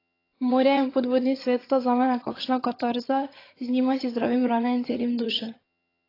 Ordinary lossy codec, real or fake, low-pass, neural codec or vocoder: AAC, 24 kbps; fake; 5.4 kHz; vocoder, 22.05 kHz, 80 mel bands, HiFi-GAN